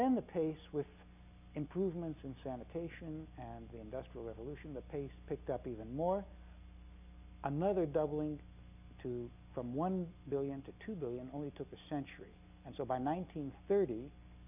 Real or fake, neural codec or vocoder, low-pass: real; none; 3.6 kHz